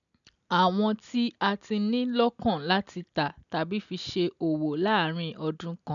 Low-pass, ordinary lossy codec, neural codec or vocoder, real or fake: 7.2 kHz; none; none; real